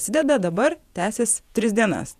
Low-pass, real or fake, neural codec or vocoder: 14.4 kHz; fake; vocoder, 48 kHz, 128 mel bands, Vocos